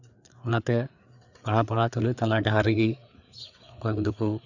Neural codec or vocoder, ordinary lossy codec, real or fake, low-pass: codec, 16 kHz, 4 kbps, FreqCodec, larger model; none; fake; 7.2 kHz